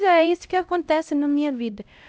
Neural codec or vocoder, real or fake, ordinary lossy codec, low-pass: codec, 16 kHz, 0.5 kbps, X-Codec, HuBERT features, trained on LibriSpeech; fake; none; none